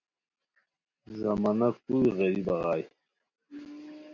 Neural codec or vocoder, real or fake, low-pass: none; real; 7.2 kHz